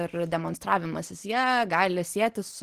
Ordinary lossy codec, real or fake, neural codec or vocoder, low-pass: Opus, 16 kbps; real; none; 14.4 kHz